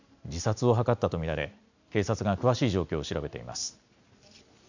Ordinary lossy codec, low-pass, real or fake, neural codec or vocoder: none; 7.2 kHz; real; none